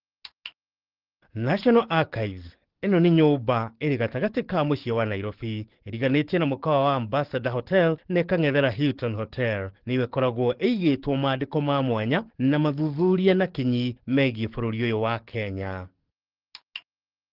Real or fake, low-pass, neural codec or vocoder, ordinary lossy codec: fake; 5.4 kHz; codec, 44.1 kHz, 7.8 kbps, DAC; Opus, 16 kbps